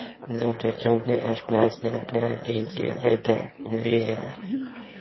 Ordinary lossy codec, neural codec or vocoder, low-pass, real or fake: MP3, 24 kbps; autoencoder, 22.05 kHz, a latent of 192 numbers a frame, VITS, trained on one speaker; 7.2 kHz; fake